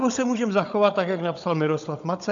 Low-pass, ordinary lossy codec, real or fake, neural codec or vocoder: 7.2 kHz; AAC, 64 kbps; fake; codec, 16 kHz, 16 kbps, FunCodec, trained on Chinese and English, 50 frames a second